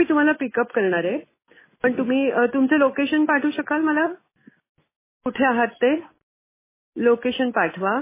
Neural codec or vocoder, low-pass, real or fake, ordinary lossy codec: none; 3.6 kHz; real; MP3, 16 kbps